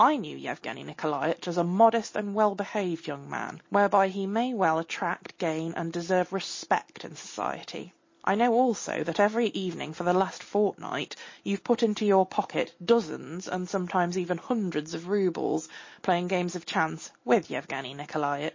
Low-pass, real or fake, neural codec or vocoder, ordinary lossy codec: 7.2 kHz; real; none; MP3, 32 kbps